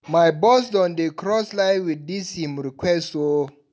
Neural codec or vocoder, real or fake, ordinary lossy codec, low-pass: none; real; none; none